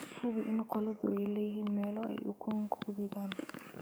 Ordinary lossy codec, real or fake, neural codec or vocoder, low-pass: none; fake; codec, 44.1 kHz, 7.8 kbps, DAC; none